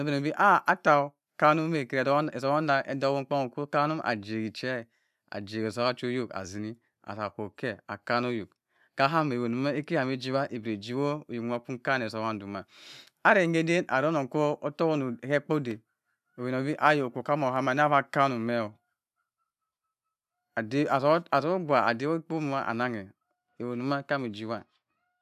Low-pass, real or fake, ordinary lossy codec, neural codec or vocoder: 14.4 kHz; real; none; none